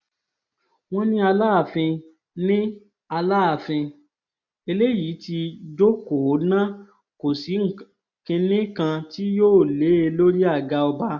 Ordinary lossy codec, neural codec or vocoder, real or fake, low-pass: none; none; real; 7.2 kHz